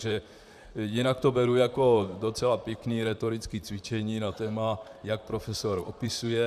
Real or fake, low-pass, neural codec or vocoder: fake; 14.4 kHz; vocoder, 44.1 kHz, 128 mel bands, Pupu-Vocoder